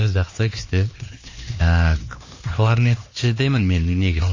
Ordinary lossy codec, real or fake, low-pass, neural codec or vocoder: MP3, 32 kbps; fake; 7.2 kHz; codec, 16 kHz, 2 kbps, X-Codec, HuBERT features, trained on LibriSpeech